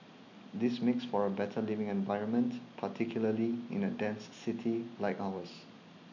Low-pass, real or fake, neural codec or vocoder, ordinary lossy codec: 7.2 kHz; real; none; none